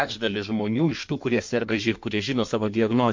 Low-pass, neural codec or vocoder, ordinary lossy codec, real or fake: 7.2 kHz; codec, 16 kHz in and 24 kHz out, 1.1 kbps, FireRedTTS-2 codec; MP3, 48 kbps; fake